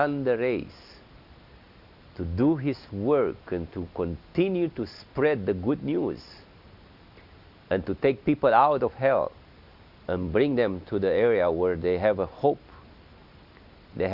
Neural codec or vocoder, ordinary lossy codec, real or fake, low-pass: none; Opus, 64 kbps; real; 5.4 kHz